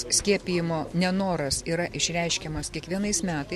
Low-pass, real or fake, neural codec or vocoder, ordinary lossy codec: 14.4 kHz; real; none; MP3, 64 kbps